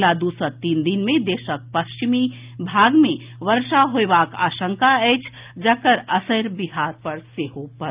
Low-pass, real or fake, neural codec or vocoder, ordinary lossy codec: 3.6 kHz; real; none; Opus, 24 kbps